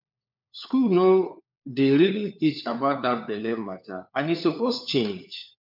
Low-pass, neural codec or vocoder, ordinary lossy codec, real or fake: 5.4 kHz; codec, 16 kHz, 4 kbps, FunCodec, trained on LibriTTS, 50 frames a second; MP3, 48 kbps; fake